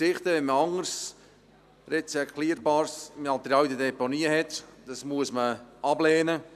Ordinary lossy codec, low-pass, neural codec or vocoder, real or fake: none; 14.4 kHz; none; real